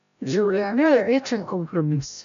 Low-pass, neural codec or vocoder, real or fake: 7.2 kHz; codec, 16 kHz, 0.5 kbps, FreqCodec, larger model; fake